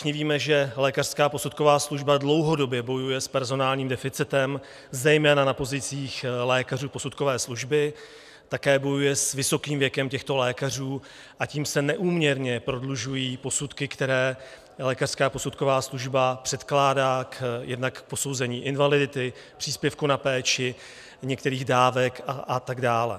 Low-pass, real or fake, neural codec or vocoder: 14.4 kHz; real; none